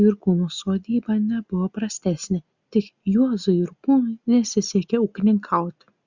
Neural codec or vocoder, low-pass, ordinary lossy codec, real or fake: none; 7.2 kHz; Opus, 64 kbps; real